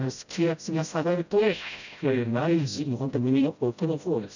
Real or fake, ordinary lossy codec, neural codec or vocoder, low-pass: fake; none; codec, 16 kHz, 0.5 kbps, FreqCodec, smaller model; 7.2 kHz